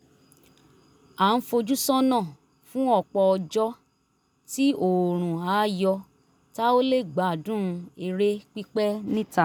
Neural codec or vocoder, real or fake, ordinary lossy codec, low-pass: none; real; none; none